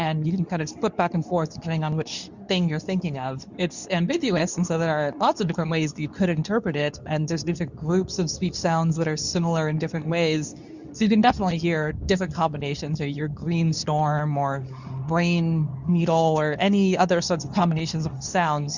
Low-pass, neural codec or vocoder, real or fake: 7.2 kHz; codec, 24 kHz, 0.9 kbps, WavTokenizer, medium speech release version 2; fake